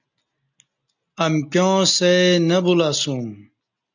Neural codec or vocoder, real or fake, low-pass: none; real; 7.2 kHz